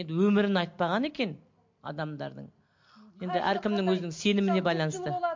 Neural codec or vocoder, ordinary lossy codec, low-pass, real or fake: none; MP3, 48 kbps; 7.2 kHz; real